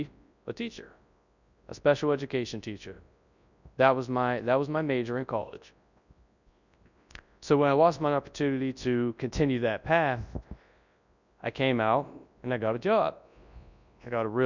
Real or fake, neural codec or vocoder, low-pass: fake; codec, 24 kHz, 0.9 kbps, WavTokenizer, large speech release; 7.2 kHz